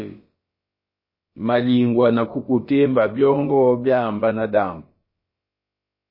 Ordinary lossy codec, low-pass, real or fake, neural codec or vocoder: MP3, 24 kbps; 5.4 kHz; fake; codec, 16 kHz, about 1 kbps, DyCAST, with the encoder's durations